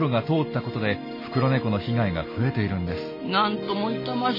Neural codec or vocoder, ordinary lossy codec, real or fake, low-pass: none; MP3, 24 kbps; real; 5.4 kHz